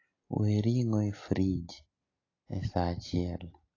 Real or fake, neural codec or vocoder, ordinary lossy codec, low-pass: real; none; AAC, 32 kbps; 7.2 kHz